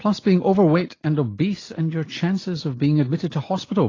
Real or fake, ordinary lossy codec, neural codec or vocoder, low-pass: real; AAC, 32 kbps; none; 7.2 kHz